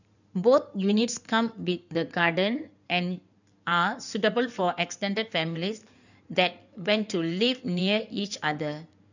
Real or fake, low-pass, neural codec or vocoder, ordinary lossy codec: fake; 7.2 kHz; codec, 16 kHz in and 24 kHz out, 2.2 kbps, FireRedTTS-2 codec; none